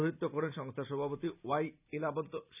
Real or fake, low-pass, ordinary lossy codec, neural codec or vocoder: real; 3.6 kHz; none; none